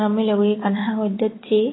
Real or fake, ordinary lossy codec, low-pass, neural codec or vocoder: fake; AAC, 16 kbps; 7.2 kHz; vocoder, 44.1 kHz, 128 mel bands every 256 samples, BigVGAN v2